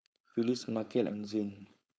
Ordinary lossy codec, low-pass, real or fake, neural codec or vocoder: none; none; fake; codec, 16 kHz, 4.8 kbps, FACodec